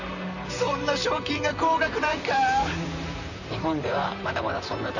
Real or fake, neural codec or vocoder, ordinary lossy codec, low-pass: fake; vocoder, 44.1 kHz, 128 mel bands, Pupu-Vocoder; none; 7.2 kHz